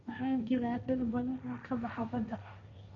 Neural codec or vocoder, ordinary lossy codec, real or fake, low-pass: codec, 16 kHz, 1.1 kbps, Voila-Tokenizer; none; fake; 7.2 kHz